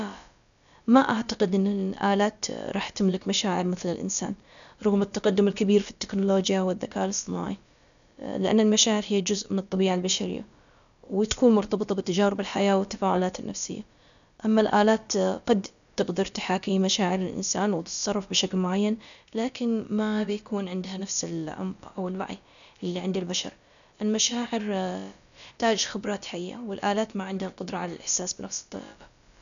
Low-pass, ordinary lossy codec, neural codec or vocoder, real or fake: 7.2 kHz; none; codec, 16 kHz, about 1 kbps, DyCAST, with the encoder's durations; fake